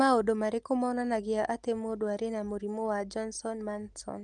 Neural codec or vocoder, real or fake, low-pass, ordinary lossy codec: none; real; 9.9 kHz; Opus, 24 kbps